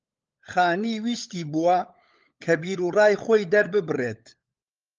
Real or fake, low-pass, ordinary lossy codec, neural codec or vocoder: fake; 7.2 kHz; Opus, 24 kbps; codec, 16 kHz, 16 kbps, FunCodec, trained on LibriTTS, 50 frames a second